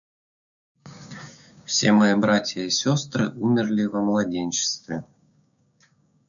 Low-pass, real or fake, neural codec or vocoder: 7.2 kHz; fake; codec, 16 kHz, 6 kbps, DAC